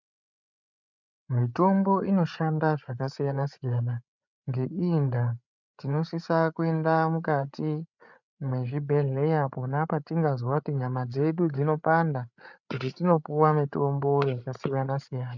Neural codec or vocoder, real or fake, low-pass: codec, 16 kHz, 8 kbps, FreqCodec, larger model; fake; 7.2 kHz